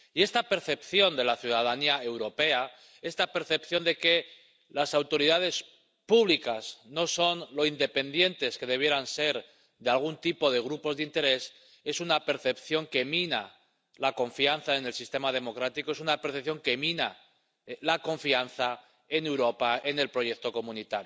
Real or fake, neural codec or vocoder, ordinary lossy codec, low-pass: real; none; none; none